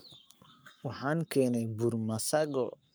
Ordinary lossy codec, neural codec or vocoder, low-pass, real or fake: none; codec, 44.1 kHz, 7.8 kbps, Pupu-Codec; none; fake